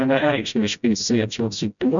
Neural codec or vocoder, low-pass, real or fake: codec, 16 kHz, 0.5 kbps, FreqCodec, smaller model; 7.2 kHz; fake